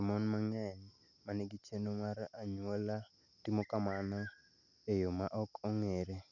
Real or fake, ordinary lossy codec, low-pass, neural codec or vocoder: real; none; 7.2 kHz; none